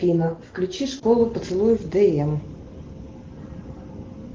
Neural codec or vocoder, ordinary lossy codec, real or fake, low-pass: none; Opus, 16 kbps; real; 7.2 kHz